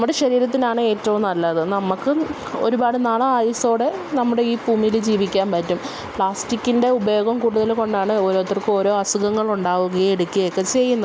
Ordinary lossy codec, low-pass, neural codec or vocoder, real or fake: none; none; none; real